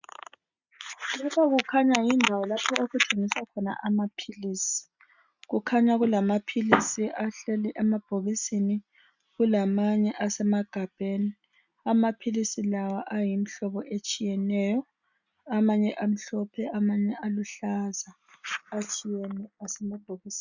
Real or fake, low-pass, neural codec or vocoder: real; 7.2 kHz; none